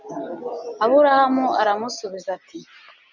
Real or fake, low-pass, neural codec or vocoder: real; 7.2 kHz; none